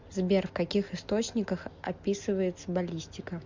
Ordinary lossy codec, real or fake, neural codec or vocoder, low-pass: MP3, 64 kbps; real; none; 7.2 kHz